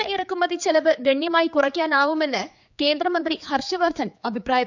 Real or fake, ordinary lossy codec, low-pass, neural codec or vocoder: fake; none; 7.2 kHz; codec, 16 kHz, 4 kbps, X-Codec, HuBERT features, trained on general audio